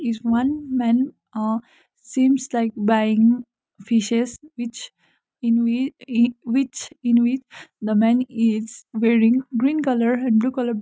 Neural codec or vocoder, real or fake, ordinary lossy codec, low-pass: none; real; none; none